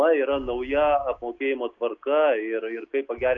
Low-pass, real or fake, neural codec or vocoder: 7.2 kHz; real; none